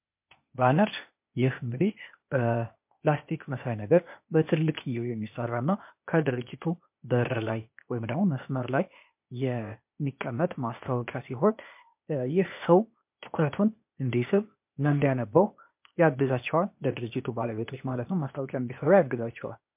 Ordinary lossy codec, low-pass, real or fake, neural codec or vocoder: MP3, 32 kbps; 3.6 kHz; fake; codec, 16 kHz, 0.8 kbps, ZipCodec